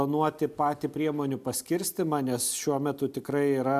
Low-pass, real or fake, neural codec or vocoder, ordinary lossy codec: 14.4 kHz; real; none; MP3, 96 kbps